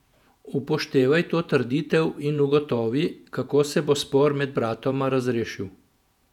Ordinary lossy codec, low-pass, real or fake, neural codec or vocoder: none; 19.8 kHz; fake; vocoder, 48 kHz, 128 mel bands, Vocos